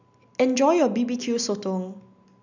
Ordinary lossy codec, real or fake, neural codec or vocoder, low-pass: none; real; none; 7.2 kHz